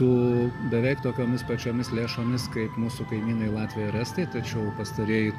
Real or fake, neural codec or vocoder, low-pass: real; none; 14.4 kHz